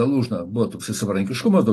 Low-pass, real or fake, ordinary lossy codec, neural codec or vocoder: 14.4 kHz; real; AAC, 48 kbps; none